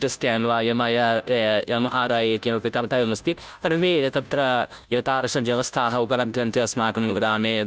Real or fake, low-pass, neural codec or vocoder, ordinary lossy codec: fake; none; codec, 16 kHz, 0.5 kbps, FunCodec, trained on Chinese and English, 25 frames a second; none